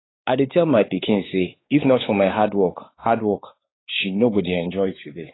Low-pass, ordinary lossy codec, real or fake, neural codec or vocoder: 7.2 kHz; AAC, 16 kbps; fake; codec, 16 kHz, 4 kbps, X-Codec, WavLM features, trained on Multilingual LibriSpeech